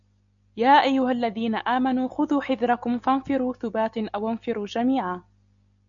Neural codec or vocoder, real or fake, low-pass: none; real; 7.2 kHz